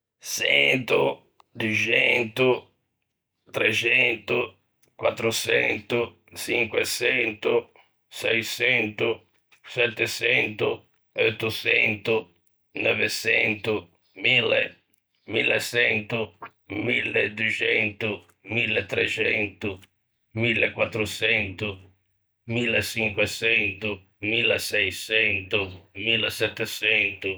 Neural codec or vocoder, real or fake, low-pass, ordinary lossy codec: none; real; none; none